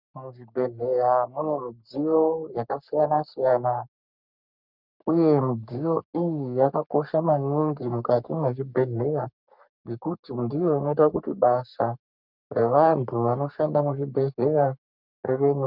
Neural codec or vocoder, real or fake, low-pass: codec, 44.1 kHz, 3.4 kbps, Pupu-Codec; fake; 5.4 kHz